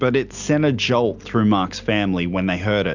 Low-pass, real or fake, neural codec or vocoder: 7.2 kHz; real; none